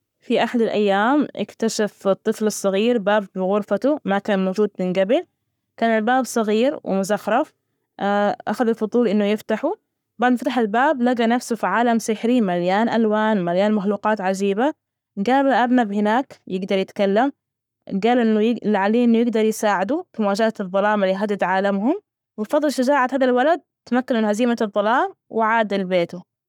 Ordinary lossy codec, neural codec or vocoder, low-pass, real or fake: none; codec, 44.1 kHz, 7.8 kbps, Pupu-Codec; 19.8 kHz; fake